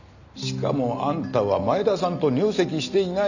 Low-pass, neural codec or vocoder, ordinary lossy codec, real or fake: 7.2 kHz; none; none; real